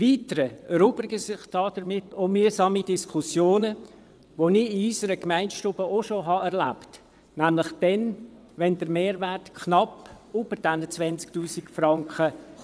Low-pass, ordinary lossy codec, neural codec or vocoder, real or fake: none; none; vocoder, 22.05 kHz, 80 mel bands, WaveNeXt; fake